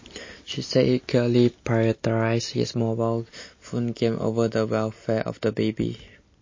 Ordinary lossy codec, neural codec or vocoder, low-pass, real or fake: MP3, 32 kbps; none; 7.2 kHz; real